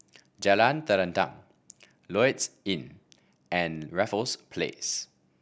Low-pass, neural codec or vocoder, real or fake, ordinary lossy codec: none; none; real; none